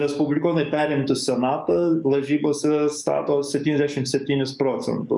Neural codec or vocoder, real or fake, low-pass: codec, 44.1 kHz, 7.8 kbps, DAC; fake; 10.8 kHz